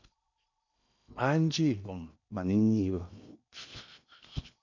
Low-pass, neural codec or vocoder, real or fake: 7.2 kHz; codec, 16 kHz in and 24 kHz out, 0.8 kbps, FocalCodec, streaming, 65536 codes; fake